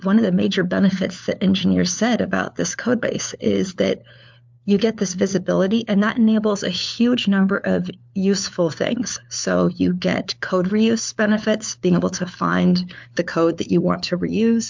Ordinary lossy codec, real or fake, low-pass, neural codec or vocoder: MP3, 64 kbps; fake; 7.2 kHz; codec, 16 kHz, 4 kbps, FunCodec, trained on LibriTTS, 50 frames a second